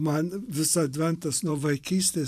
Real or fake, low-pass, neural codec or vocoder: fake; 14.4 kHz; vocoder, 44.1 kHz, 128 mel bands every 512 samples, BigVGAN v2